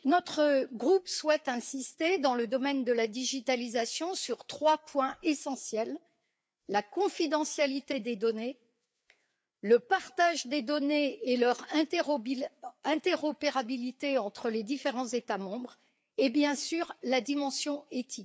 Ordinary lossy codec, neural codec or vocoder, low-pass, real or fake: none; codec, 16 kHz, 4 kbps, FreqCodec, larger model; none; fake